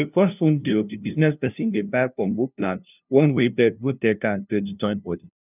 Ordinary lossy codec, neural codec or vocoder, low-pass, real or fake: none; codec, 16 kHz, 0.5 kbps, FunCodec, trained on LibriTTS, 25 frames a second; 3.6 kHz; fake